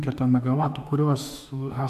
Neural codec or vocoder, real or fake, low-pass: autoencoder, 48 kHz, 32 numbers a frame, DAC-VAE, trained on Japanese speech; fake; 14.4 kHz